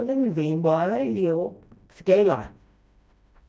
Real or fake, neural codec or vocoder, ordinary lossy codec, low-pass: fake; codec, 16 kHz, 1 kbps, FreqCodec, smaller model; none; none